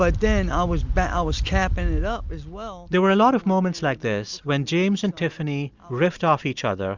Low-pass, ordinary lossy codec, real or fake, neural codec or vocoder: 7.2 kHz; Opus, 64 kbps; real; none